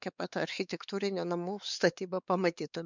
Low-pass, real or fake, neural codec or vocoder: 7.2 kHz; fake; codec, 16 kHz, 4 kbps, X-Codec, WavLM features, trained on Multilingual LibriSpeech